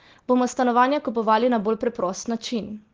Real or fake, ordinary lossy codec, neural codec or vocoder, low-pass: real; Opus, 16 kbps; none; 7.2 kHz